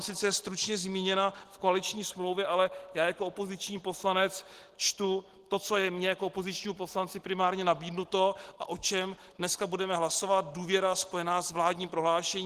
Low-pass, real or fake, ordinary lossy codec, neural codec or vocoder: 14.4 kHz; real; Opus, 16 kbps; none